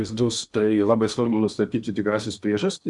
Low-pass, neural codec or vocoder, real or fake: 10.8 kHz; codec, 16 kHz in and 24 kHz out, 0.8 kbps, FocalCodec, streaming, 65536 codes; fake